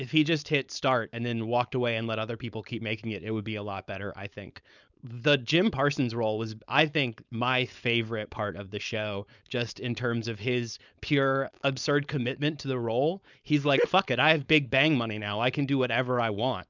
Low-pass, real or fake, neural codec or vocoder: 7.2 kHz; fake; codec, 16 kHz, 4.8 kbps, FACodec